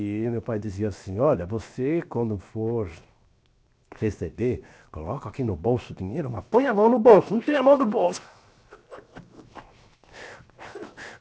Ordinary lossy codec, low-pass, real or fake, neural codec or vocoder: none; none; fake; codec, 16 kHz, 0.7 kbps, FocalCodec